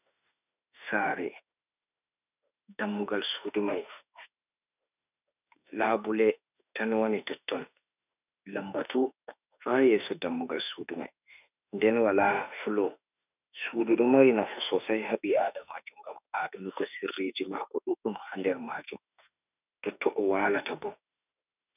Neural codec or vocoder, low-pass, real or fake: autoencoder, 48 kHz, 32 numbers a frame, DAC-VAE, trained on Japanese speech; 3.6 kHz; fake